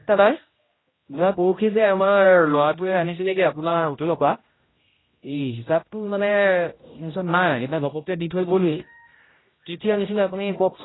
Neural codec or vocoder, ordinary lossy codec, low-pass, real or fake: codec, 16 kHz, 0.5 kbps, X-Codec, HuBERT features, trained on general audio; AAC, 16 kbps; 7.2 kHz; fake